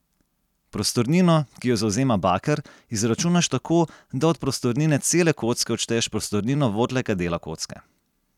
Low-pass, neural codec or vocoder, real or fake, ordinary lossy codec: 19.8 kHz; none; real; none